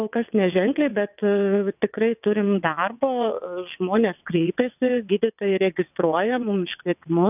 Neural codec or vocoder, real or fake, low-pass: vocoder, 22.05 kHz, 80 mel bands, WaveNeXt; fake; 3.6 kHz